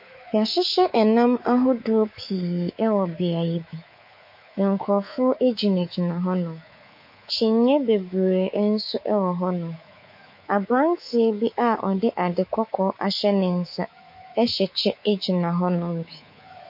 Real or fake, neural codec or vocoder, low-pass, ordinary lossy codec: fake; codec, 24 kHz, 3.1 kbps, DualCodec; 5.4 kHz; MP3, 32 kbps